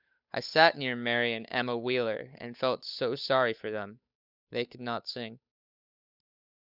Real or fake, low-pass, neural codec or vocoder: fake; 5.4 kHz; codec, 16 kHz, 8 kbps, FunCodec, trained on Chinese and English, 25 frames a second